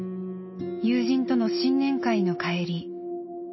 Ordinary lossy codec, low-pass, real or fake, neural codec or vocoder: MP3, 24 kbps; 7.2 kHz; real; none